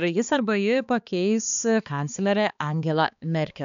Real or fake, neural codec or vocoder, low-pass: fake; codec, 16 kHz, 2 kbps, X-Codec, HuBERT features, trained on balanced general audio; 7.2 kHz